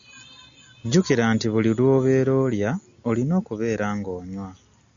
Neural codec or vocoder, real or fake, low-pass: none; real; 7.2 kHz